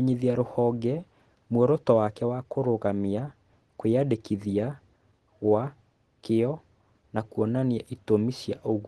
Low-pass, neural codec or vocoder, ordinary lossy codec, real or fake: 10.8 kHz; none; Opus, 16 kbps; real